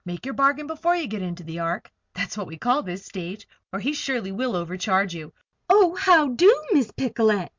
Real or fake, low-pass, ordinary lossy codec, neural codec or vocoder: real; 7.2 kHz; MP3, 64 kbps; none